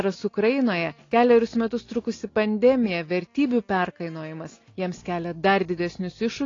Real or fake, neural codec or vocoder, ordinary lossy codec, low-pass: real; none; AAC, 32 kbps; 7.2 kHz